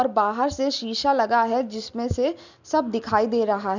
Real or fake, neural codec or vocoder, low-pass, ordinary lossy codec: real; none; 7.2 kHz; none